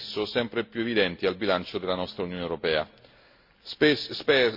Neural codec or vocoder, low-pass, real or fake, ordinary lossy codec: none; 5.4 kHz; real; MP3, 32 kbps